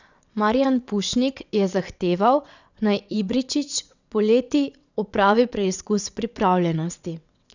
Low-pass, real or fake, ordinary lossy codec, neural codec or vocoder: 7.2 kHz; fake; none; vocoder, 22.05 kHz, 80 mel bands, WaveNeXt